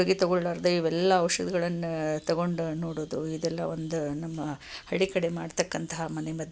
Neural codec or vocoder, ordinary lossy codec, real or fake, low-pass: none; none; real; none